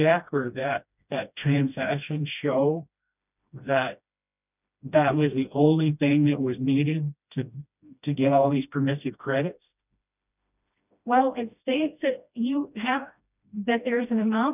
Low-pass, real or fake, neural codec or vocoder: 3.6 kHz; fake; codec, 16 kHz, 1 kbps, FreqCodec, smaller model